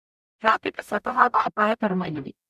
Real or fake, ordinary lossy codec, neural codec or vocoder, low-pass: fake; none; codec, 44.1 kHz, 0.9 kbps, DAC; 14.4 kHz